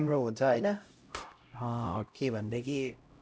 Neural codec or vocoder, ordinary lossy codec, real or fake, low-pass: codec, 16 kHz, 0.5 kbps, X-Codec, HuBERT features, trained on LibriSpeech; none; fake; none